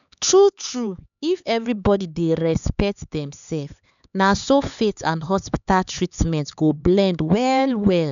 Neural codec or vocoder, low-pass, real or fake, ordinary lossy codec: codec, 16 kHz, 4 kbps, X-Codec, HuBERT features, trained on LibriSpeech; 7.2 kHz; fake; none